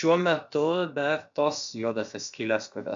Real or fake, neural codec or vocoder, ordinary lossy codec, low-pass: fake; codec, 16 kHz, about 1 kbps, DyCAST, with the encoder's durations; MP3, 64 kbps; 7.2 kHz